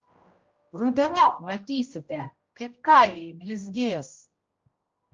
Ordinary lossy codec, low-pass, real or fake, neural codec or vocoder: Opus, 24 kbps; 7.2 kHz; fake; codec, 16 kHz, 0.5 kbps, X-Codec, HuBERT features, trained on general audio